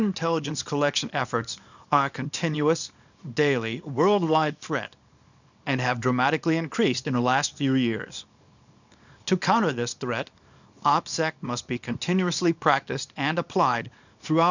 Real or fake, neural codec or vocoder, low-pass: fake; codec, 24 kHz, 0.9 kbps, WavTokenizer, small release; 7.2 kHz